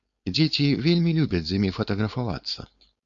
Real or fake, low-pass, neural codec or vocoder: fake; 7.2 kHz; codec, 16 kHz, 4.8 kbps, FACodec